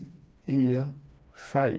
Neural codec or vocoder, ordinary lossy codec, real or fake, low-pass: codec, 16 kHz, 2 kbps, FreqCodec, smaller model; none; fake; none